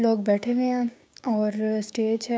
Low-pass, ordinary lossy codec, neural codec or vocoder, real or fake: none; none; codec, 16 kHz, 6 kbps, DAC; fake